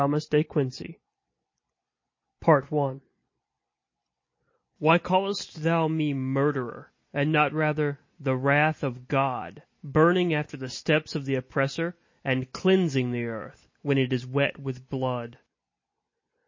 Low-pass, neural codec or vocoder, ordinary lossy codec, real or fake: 7.2 kHz; none; MP3, 32 kbps; real